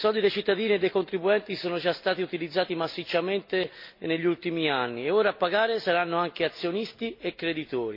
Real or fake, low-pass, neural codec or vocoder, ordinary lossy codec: real; 5.4 kHz; none; MP3, 32 kbps